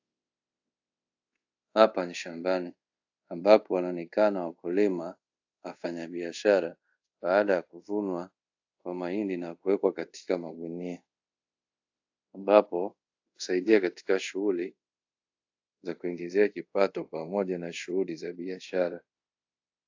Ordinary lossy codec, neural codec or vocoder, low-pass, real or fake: AAC, 48 kbps; codec, 24 kHz, 0.5 kbps, DualCodec; 7.2 kHz; fake